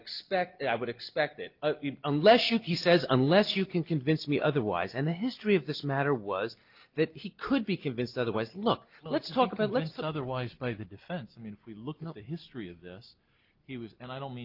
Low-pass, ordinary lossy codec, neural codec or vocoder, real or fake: 5.4 kHz; Opus, 24 kbps; none; real